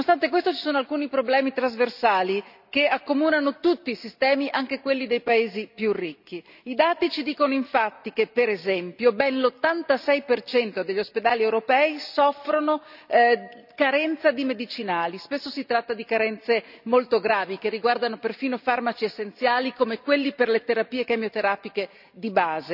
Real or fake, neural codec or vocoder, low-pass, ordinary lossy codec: real; none; 5.4 kHz; none